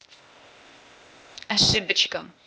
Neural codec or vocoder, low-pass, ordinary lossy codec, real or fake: codec, 16 kHz, 0.8 kbps, ZipCodec; none; none; fake